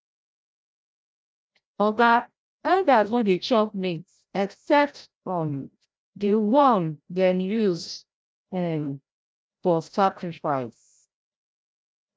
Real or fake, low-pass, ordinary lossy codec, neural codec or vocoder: fake; none; none; codec, 16 kHz, 0.5 kbps, FreqCodec, larger model